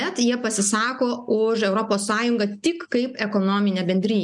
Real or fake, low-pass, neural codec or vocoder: real; 10.8 kHz; none